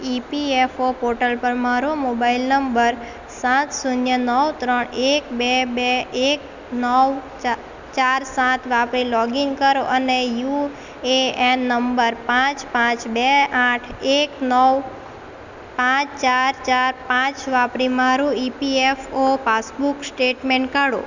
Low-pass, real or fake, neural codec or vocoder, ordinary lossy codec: 7.2 kHz; real; none; none